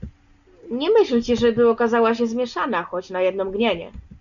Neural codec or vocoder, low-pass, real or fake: none; 7.2 kHz; real